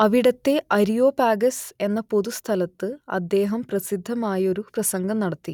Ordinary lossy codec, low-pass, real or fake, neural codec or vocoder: none; 19.8 kHz; real; none